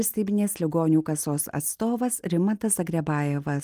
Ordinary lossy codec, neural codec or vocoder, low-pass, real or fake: Opus, 32 kbps; none; 14.4 kHz; real